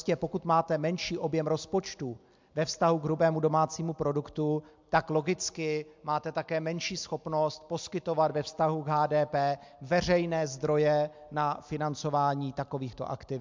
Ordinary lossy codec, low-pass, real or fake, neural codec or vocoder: MP3, 64 kbps; 7.2 kHz; real; none